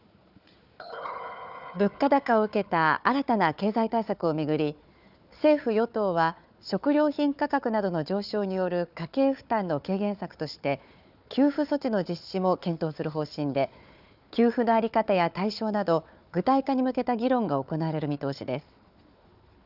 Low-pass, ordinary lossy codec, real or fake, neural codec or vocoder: 5.4 kHz; none; fake; codec, 16 kHz, 4 kbps, FunCodec, trained on Chinese and English, 50 frames a second